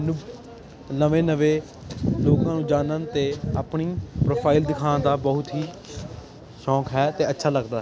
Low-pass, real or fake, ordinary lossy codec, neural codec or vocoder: none; real; none; none